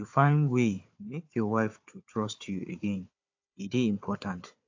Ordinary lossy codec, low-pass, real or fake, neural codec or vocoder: AAC, 48 kbps; 7.2 kHz; fake; codec, 16 kHz, 4 kbps, FunCodec, trained on Chinese and English, 50 frames a second